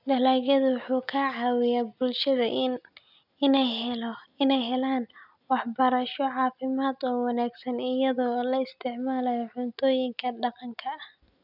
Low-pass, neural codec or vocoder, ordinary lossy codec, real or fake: 5.4 kHz; none; none; real